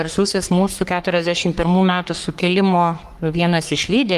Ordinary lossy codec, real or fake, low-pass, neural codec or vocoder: Opus, 32 kbps; fake; 14.4 kHz; codec, 44.1 kHz, 3.4 kbps, Pupu-Codec